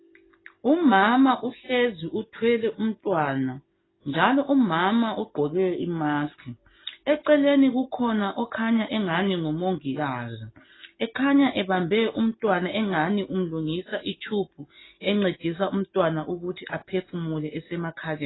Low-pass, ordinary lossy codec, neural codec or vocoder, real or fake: 7.2 kHz; AAC, 16 kbps; none; real